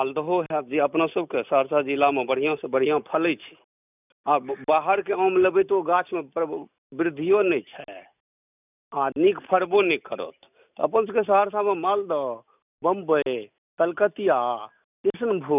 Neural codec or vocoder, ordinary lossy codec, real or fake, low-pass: none; none; real; 3.6 kHz